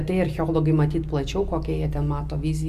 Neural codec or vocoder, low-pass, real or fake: none; 14.4 kHz; real